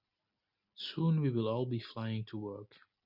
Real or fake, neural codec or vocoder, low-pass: real; none; 5.4 kHz